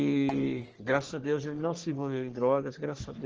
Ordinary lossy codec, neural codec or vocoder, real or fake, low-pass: Opus, 16 kbps; codec, 44.1 kHz, 3.4 kbps, Pupu-Codec; fake; 7.2 kHz